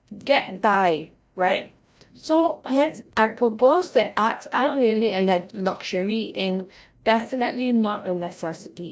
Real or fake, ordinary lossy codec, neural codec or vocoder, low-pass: fake; none; codec, 16 kHz, 0.5 kbps, FreqCodec, larger model; none